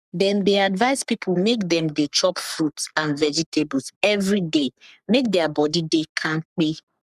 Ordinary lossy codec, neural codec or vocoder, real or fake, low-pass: none; codec, 44.1 kHz, 3.4 kbps, Pupu-Codec; fake; 14.4 kHz